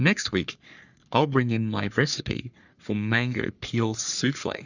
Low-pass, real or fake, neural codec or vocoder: 7.2 kHz; fake; codec, 44.1 kHz, 3.4 kbps, Pupu-Codec